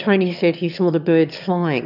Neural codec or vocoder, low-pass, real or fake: autoencoder, 22.05 kHz, a latent of 192 numbers a frame, VITS, trained on one speaker; 5.4 kHz; fake